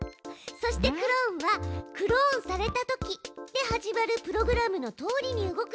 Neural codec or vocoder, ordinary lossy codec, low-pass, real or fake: none; none; none; real